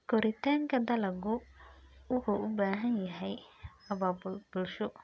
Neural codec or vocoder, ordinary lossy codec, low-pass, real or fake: none; none; none; real